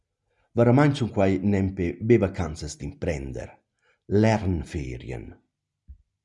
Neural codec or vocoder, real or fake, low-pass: none; real; 10.8 kHz